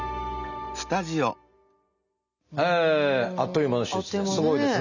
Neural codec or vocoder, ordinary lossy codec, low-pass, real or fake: none; none; 7.2 kHz; real